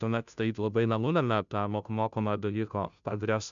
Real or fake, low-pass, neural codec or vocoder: fake; 7.2 kHz; codec, 16 kHz, 0.5 kbps, FunCodec, trained on Chinese and English, 25 frames a second